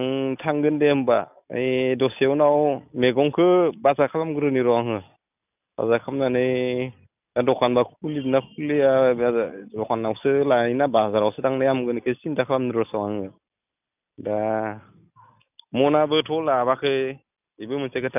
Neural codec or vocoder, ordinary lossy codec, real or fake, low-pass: none; none; real; 3.6 kHz